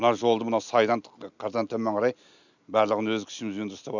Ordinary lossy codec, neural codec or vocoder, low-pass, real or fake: none; none; 7.2 kHz; real